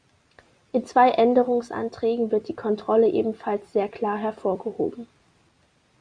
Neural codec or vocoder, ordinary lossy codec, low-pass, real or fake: none; Opus, 64 kbps; 9.9 kHz; real